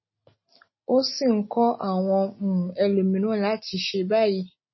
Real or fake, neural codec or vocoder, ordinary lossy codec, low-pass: real; none; MP3, 24 kbps; 7.2 kHz